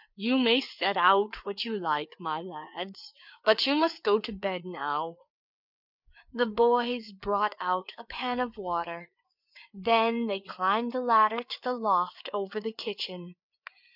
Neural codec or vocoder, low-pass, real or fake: codec, 16 kHz, 4 kbps, FreqCodec, larger model; 5.4 kHz; fake